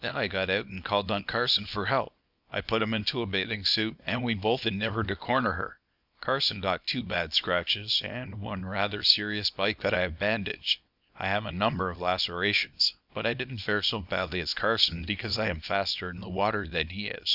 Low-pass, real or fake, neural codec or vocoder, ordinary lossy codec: 5.4 kHz; fake; codec, 24 kHz, 0.9 kbps, WavTokenizer, small release; Opus, 64 kbps